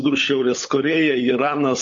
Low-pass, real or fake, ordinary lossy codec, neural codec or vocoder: 7.2 kHz; fake; MP3, 48 kbps; codec, 16 kHz, 16 kbps, FunCodec, trained on LibriTTS, 50 frames a second